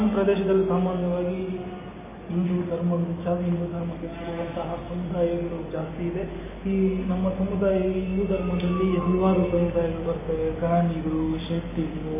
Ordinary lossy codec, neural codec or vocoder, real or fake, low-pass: MP3, 16 kbps; none; real; 3.6 kHz